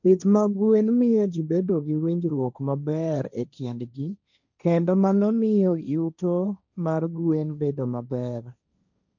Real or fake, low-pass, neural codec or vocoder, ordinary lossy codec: fake; 7.2 kHz; codec, 16 kHz, 1.1 kbps, Voila-Tokenizer; none